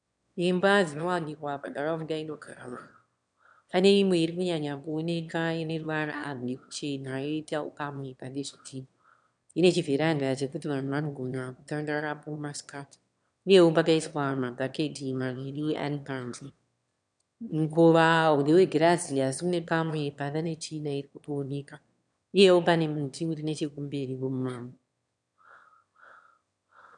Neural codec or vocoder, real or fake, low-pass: autoencoder, 22.05 kHz, a latent of 192 numbers a frame, VITS, trained on one speaker; fake; 9.9 kHz